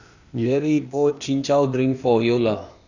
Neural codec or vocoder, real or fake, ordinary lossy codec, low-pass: codec, 16 kHz, 0.8 kbps, ZipCodec; fake; none; 7.2 kHz